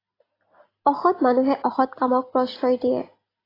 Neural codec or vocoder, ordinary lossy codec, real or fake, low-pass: none; AAC, 24 kbps; real; 5.4 kHz